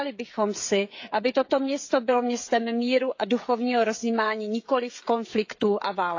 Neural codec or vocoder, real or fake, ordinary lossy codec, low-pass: codec, 16 kHz, 16 kbps, FreqCodec, smaller model; fake; AAC, 48 kbps; 7.2 kHz